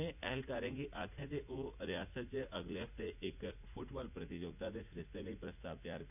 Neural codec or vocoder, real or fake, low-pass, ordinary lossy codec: vocoder, 44.1 kHz, 80 mel bands, Vocos; fake; 3.6 kHz; none